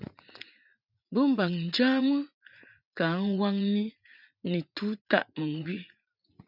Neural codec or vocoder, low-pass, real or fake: vocoder, 44.1 kHz, 80 mel bands, Vocos; 5.4 kHz; fake